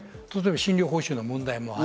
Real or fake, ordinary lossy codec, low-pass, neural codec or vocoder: real; none; none; none